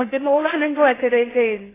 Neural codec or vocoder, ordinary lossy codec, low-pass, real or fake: codec, 16 kHz in and 24 kHz out, 0.6 kbps, FocalCodec, streaming, 2048 codes; AAC, 16 kbps; 3.6 kHz; fake